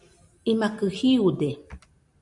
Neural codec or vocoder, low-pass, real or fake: none; 10.8 kHz; real